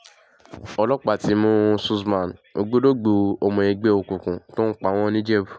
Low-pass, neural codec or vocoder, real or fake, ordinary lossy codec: none; none; real; none